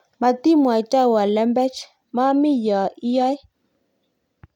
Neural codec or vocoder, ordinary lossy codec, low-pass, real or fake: none; none; 19.8 kHz; real